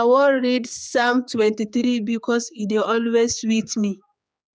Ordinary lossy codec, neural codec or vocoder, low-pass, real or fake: none; codec, 16 kHz, 4 kbps, X-Codec, HuBERT features, trained on general audio; none; fake